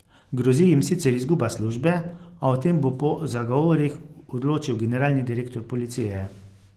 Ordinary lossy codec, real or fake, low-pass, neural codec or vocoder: Opus, 16 kbps; fake; 14.4 kHz; autoencoder, 48 kHz, 128 numbers a frame, DAC-VAE, trained on Japanese speech